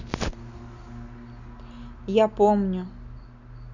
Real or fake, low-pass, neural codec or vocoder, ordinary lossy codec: real; 7.2 kHz; none; none